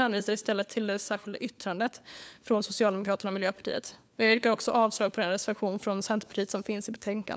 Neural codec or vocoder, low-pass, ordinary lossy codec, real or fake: codec, 16 kHz, 4 kbps, FunCodec, trained on LibriTTS, 50 frames a second; none; none; fake